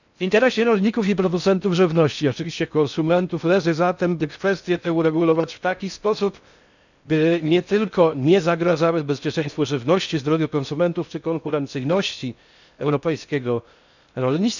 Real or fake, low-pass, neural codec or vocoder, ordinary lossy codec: fake; 7.2 kHz; codec, 16 kHz in and 24 kHz out, 0.6 kbps, FocalCodec, streaming, 4096 codes; none